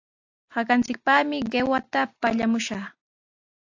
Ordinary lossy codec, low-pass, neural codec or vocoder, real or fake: AAC, 48 kbps; 7.2 kHz; none; real